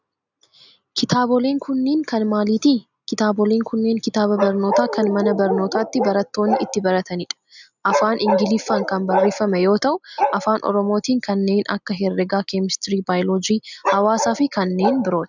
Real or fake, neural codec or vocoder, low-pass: real; none; 7.2 kHz